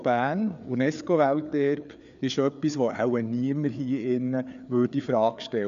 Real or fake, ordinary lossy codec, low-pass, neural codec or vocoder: fake; MP3, 96 kbps; 7.2 kHz; codec, 16 kHz, 4 kbps, FreqCodec, larger model